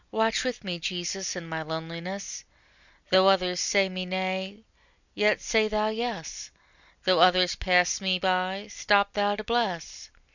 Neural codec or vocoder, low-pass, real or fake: none; 7.2 kHz; real